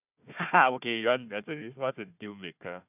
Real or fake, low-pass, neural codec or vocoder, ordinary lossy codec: fake; 3.6 kHz; codec, 16 kHz, 1 kbps, FunCodec, trained on Chinese and English, 50 frames a second; none